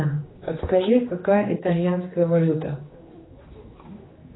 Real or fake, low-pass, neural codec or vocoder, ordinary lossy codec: fake; 7.2 kHz; codec, 16 kHz, 2 kbps, X-Codec, HuBERT features, trained on general audio; AAC, 16 kbps